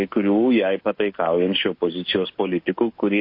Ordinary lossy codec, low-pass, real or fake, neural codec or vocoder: MP3, 32 kbps; 5.4 kHz; fake; codec, 16 kHz, 6 kbps, DAC